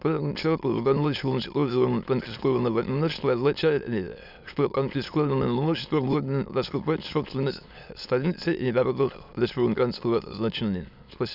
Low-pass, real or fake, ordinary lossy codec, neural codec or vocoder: 5.4 kHz; fake; none; autoencoder, 22.05 kHz, a latent of 192 numbers a frame, VITS, trained on many speakers